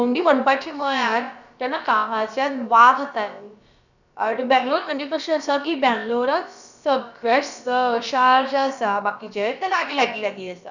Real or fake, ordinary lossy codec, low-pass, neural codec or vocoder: fake; none; 7.2 kHz; codec, 16 kHz, about 1 kbps, DyCAST, with the encoder's durations